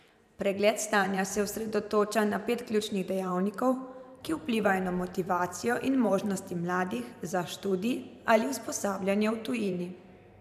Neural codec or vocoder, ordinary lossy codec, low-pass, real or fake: vocoder, 44.1 kHz, 128 mel bands every 512 samples, BigVGAN v2; none; 14.4 kHz; fake